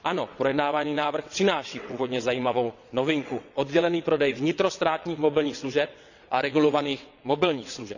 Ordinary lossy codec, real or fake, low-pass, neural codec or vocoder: none; fake; 7.2 kHz; vocoder, 22.05 kHz, 80 mel bands, WaveNeXt